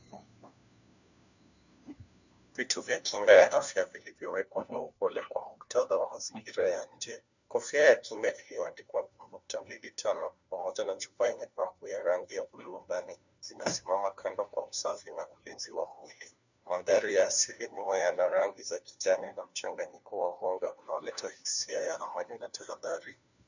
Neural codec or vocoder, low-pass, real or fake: codec, 16 kHz, 1 kbps, FunCodec, trained on LibriTTS, 50 frames a second; 7.2 kHz; fake